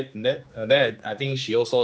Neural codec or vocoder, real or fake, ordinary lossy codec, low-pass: codec, 16 kHz, 2 kbps, X-Codec, HuBERT features, trained on general audio; fake; none; none